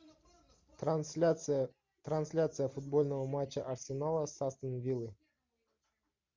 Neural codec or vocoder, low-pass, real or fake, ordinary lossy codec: none; 7.2 kHz; real; MP3, 64 kbps